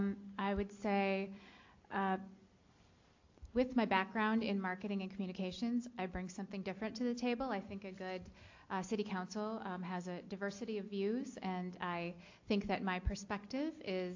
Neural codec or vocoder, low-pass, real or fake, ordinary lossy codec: none; 7.2 kHz; real; AAC, 48 kbps